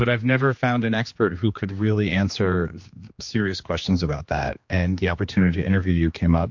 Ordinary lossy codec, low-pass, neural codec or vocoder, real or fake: MP3, 48 kbps; 7.2 kHz; codec, 16 kHz, 2 kbps, X-Codec, HuBERT features, trained on general audio; fake